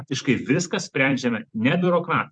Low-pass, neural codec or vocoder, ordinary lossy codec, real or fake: 9.9 kHz; vocoder, 44.1 kHz, 128 mel bands every 256 samples, BigVGAN v2; MP3, 64 kbps; fake